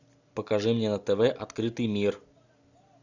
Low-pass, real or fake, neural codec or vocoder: 7.2 kHz; real; none